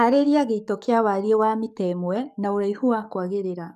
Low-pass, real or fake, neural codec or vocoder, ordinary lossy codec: 14.4 kHz; fake; codec, 44.1 kHz, 7.8 kbps, DAC; none